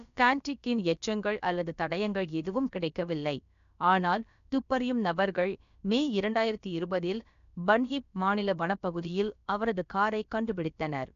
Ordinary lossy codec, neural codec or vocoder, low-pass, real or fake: none; codec, 16 kHz, about 1 kbps, DyCAST, with the encoder's durations; 7.2 kHz; fake